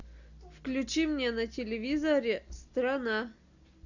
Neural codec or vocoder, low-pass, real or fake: none; 7.2 kHz; real